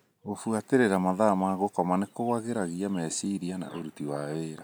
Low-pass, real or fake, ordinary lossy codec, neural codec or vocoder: none; real; none; none